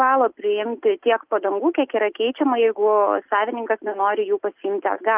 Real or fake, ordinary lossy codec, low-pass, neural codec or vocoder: real; Opus, 32 kbps; 3.6 kHz; none